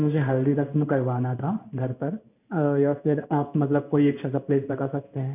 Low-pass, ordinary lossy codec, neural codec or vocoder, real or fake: 3.6 kHz; none; codec, 16 kHz in and 24 kHz out, 1 kbps, XY-Tokenizer; fake